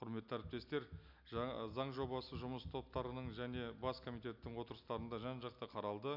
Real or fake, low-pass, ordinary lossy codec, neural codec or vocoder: real; 5.4 kHz; none; none